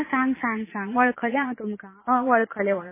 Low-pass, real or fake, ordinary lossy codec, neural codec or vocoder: 3.6 kHz; fake; MP3, 16 kbps; vocoder, 22.05 kHz, 80 mel bands, Vocos